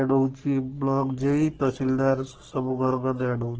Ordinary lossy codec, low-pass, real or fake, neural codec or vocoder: Opus, 16 kbps; 7.2 kHz; fake; codec, 44.1 kHz, 3.4 kbps, Pupu-Codec